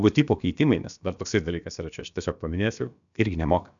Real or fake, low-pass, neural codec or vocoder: fake; 7.2 kHz; codec, 16 kHz, about 1 kbps, DyCAST, with the encoder's durations